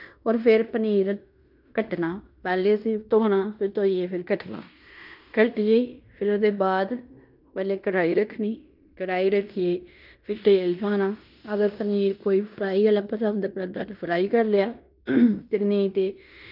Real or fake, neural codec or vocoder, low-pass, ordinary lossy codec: fake; codec, 16 kHz in and 24 kHz out, 0.9 kbps, LongCat-Audio-Codec, fine tuned four codebook decoder; 5.4 kHz; none